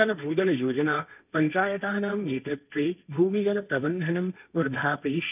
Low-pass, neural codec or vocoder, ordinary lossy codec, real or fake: 3.6 kHz; codec, 16 kHz, 1.1 kbps, Voila-Tokenizer; none; fake